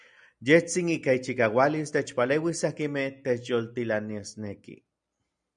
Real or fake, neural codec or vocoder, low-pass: real; none; 9.9 kHz